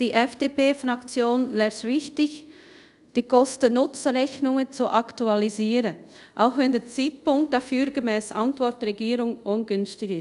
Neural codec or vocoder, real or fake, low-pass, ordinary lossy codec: codec, 24 kHz, 0.5 kbps, DualCodec; fake; 10.8 kHz; none